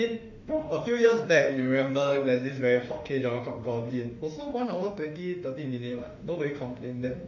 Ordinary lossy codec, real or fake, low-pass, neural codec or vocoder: none; fake; 7.2 kHz; autoencoder, 48 kHz, 32 numbers a frame, DAC-VAE, trained on Japanese speech